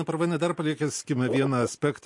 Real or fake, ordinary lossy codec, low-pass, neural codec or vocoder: real; MP3, 48 kbps; 10.8 kHz; none